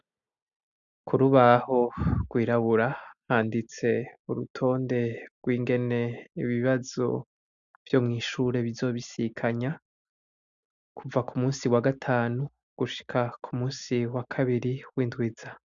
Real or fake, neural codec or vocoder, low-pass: real; none; 7.2 kHz